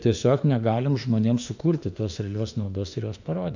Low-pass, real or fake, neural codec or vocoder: 7.2 kHz; fake; autoencoder, 48 kHz, 32 numbers a frame, DAC-VAE, trained on Japanese speech